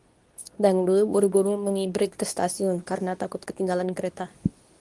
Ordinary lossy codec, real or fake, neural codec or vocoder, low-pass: Opus, 24 kbps; fake; codec, 24 kHz, 0.9 kbps, WavTokenizer, medium speech release version 2; 10.8 kHz